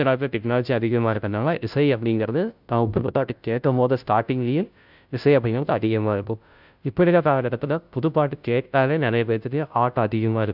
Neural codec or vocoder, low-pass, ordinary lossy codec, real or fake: codec, 16 kHz, 0.5 kbps, FunCodec, trained on Chinese and English, 25 frames a second; 5.4 kHz; none; fake